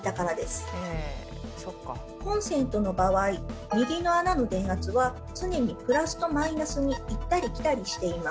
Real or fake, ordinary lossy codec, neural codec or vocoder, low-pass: real; none; none; none